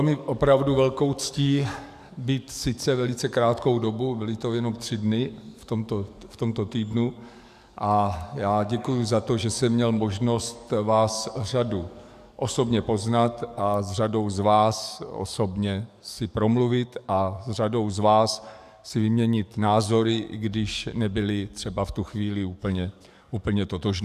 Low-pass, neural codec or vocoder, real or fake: 14.4 kHz; codec, 44.1 kHz, 7.8 kbps, DAC; fake